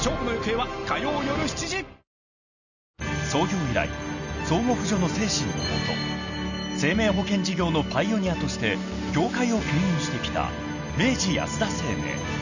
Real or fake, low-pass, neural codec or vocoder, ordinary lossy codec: real; 7.2 kHz; none; none